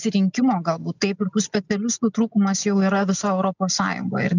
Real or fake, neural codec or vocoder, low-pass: real; none; 7.2 kHz